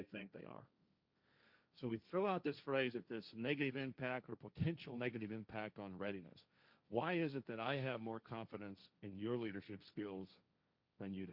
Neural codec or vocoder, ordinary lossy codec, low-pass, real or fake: codec, 16 kHz, 1.1 kbps, Voila-Tokenizer; Opus, 64 kbps; 5.4 kHz; fake